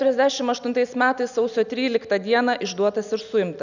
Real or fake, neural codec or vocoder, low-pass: real; none; 7.2 kHz